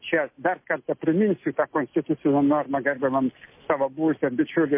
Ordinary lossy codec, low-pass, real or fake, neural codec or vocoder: MP3, 24 kbps; 3.6 kHz; real; none